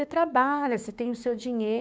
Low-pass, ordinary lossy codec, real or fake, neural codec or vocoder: none; none; fake; codec, 16 kHz, 6 kbps, DAC